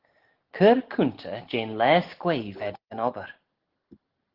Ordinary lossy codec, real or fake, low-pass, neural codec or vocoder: Opus, 16 kbps; real; 5.4 kHz; none